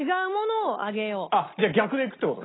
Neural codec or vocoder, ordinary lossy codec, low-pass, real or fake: none; AAC, 16 kbps; 7.2 kHz; real